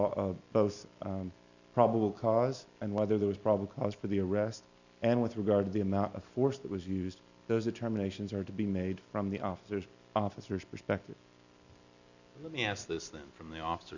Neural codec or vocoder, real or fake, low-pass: none; real; 7.2 kHz